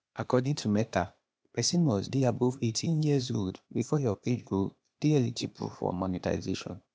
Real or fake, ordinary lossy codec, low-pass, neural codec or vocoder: fake; none; none; codec, 16 kHz, 0.8 kbps, ZipCodec